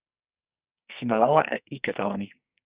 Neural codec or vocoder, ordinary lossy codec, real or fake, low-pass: codec, 44.1 kHz, 2.6 kbps, SNAC; Opus, 64 kbps; fake; 3.6 kHz